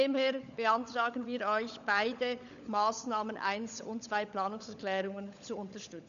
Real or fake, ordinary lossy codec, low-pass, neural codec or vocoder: fake; none; 7.2 kHz; codec, 16 kHz, 16 kbps, FunCodec, trained on LibriTTS, 50 frames a second